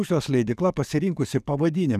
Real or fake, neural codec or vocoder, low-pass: fake; codec, 44.1 kHz, 7.8 kbps, DAC; 14.4 kHz